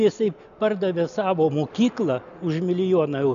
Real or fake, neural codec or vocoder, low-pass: real; none; 7.2 kHz